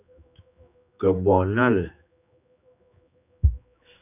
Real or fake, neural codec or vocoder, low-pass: fake; codec, 16 kHz, 2 kbps, X-Codec, HuBERT features, trained on general audio; 3.6 kHz